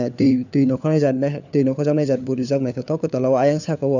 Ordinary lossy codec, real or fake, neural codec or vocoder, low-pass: none; fake; codec, 16 kHz, 4 kbps, FunCodec, trained on LibriTTS, 50 frames a second; 7.2 kHz